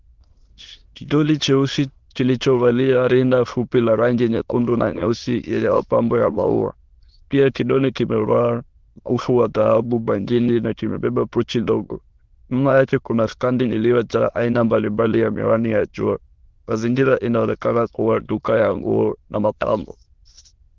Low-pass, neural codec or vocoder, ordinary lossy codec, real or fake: 7.2 kHz; autoencoder, 22.05 kHz, a latent of 192 numbers a frame, VITS, trained on many speakers; Opus, 16 kbps; fake